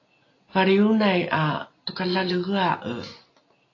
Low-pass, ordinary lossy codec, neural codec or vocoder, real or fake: 7.2 kHz; AAC, 32 kbps; none; real